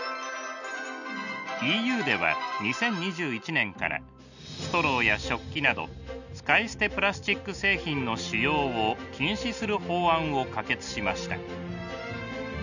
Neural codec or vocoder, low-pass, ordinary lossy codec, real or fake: none; 7.2 kHz; none; real